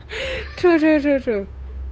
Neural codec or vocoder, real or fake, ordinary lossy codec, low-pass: codec, 16 kHz, 8 kbps, FunCodec, trained on Chinese and English, 25 frames a second; fake; none; none